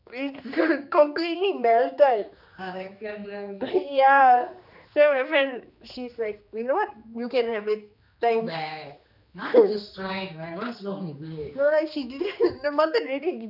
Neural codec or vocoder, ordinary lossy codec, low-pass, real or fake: codec, 16 kHz, 2 kbps, X-Codec, HuBERT features, trained on general audio; none; 5.4 kHz; fake